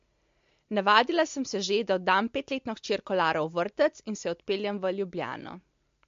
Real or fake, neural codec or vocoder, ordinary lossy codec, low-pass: real; none; MP3, 48 kbps; 7.2 kHz